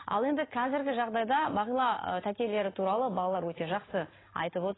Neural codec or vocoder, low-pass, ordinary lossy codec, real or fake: none; 7.2 kHz; AAC, 16 kbps; real